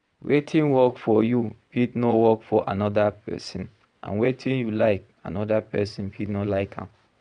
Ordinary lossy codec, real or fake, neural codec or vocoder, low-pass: none; fake; vocoder, 22.05 kHz, 80 mel bands, WaveNeXt; 9.9 kHz